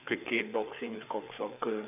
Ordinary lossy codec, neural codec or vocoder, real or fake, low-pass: none; codec, 16 kHz, 4 kbps, FreqCodec, larger model; fake; 3.6 kHz